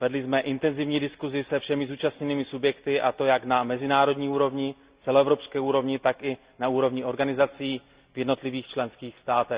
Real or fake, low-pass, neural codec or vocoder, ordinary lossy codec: real; 3.6 kHz; none; Opus, 64 kbps